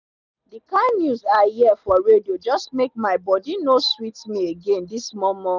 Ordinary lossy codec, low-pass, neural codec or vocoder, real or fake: none; 7.2 kHz; none; real